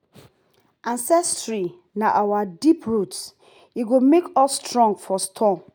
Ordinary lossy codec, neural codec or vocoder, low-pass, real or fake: none; none; none; real